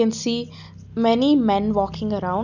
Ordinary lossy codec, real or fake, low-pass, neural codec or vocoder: none; real; 7.2 kHz; none